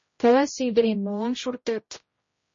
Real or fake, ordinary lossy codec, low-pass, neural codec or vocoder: fake; MP3, 32 kbps; 7.2 kHz; codec, 16 kHz, 0.5 kbps, X-Codec, HuBERT features, trained on general audio